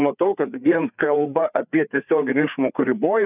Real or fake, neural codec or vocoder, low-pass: fake; codec, 16 kHz, 4 kbps, FreqCodec, larger model; 3.6 kHz